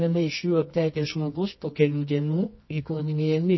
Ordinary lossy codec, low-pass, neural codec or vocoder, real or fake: MP3, 24 kbps; 7.2 kHz; codec, 24 kHz, 0.9 kbps, WavTokenizer, medium music audio release; fake